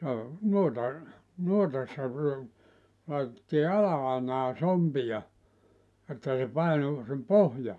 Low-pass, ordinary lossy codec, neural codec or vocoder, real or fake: none; none; none; real